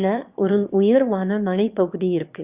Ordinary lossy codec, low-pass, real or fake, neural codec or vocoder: Opus, 64 kbps; 3.6 kHz; fake; autoencoder, 22.05 kHz, a latent of 192 numbers a frame, VITS, trained on one speaker